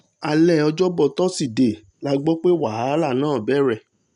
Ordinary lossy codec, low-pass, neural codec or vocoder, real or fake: none; 10.8 kHz; none; real